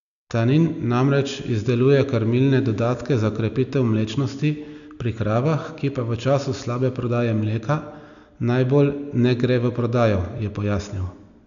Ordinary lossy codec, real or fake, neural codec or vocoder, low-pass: none; real; none; 7.2 kHz